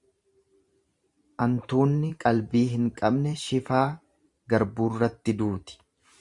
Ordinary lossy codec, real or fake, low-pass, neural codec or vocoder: Opus, 64 kbps; fake; 10.8 kHz; vocoder, 44.1 kHz, 128 mel bands every 512 samples, BigVGAN v2